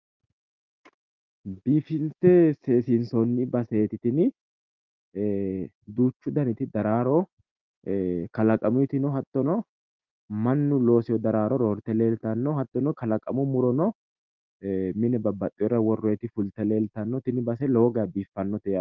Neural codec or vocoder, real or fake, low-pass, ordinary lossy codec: none; real; 7.2 kHz; Opus, 32 kbps